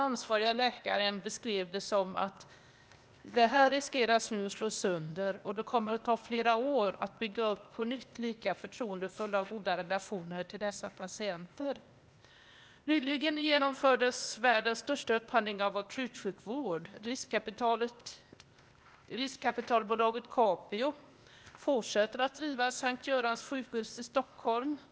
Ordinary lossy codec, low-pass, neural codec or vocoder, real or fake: none; none; codec, 16 kHz, 0.8 kbps, ZipCodec; fake